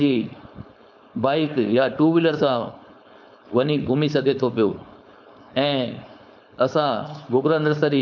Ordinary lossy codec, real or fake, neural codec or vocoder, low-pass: none; fake; codec, 16 kHz, 4.8 kbps, FACodec; 7.2 kHz